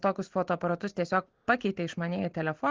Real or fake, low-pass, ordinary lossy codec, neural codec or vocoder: real; 7.2 kHz; Opus, 16 kbps; none